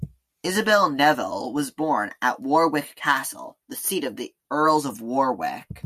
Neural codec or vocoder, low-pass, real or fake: none; 14.4 kHz; real